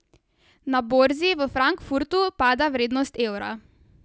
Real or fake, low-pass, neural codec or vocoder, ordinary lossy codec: real; none; none; none